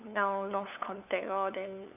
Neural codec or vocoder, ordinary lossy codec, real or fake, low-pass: codec, 16 kHz, 8 kbps, FunCodec, trained on LibriTTS, 25 frames a second; none; fake; 3.6 kHz